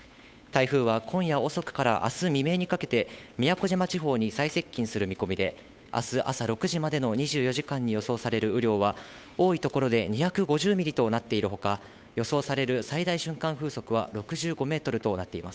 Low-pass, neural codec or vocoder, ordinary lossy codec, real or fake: none; codec, 16 kHz, 8 kbps, FunCodec, trained on Chinese and English, 25 frames a second; none; fake